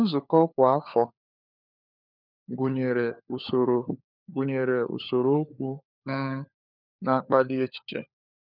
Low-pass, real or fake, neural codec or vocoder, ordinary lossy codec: 5.4 kHz; fake; codec, 16 kHz, 4 kbps, FunCodec, trained on LibriTTS, 50 frames a second; none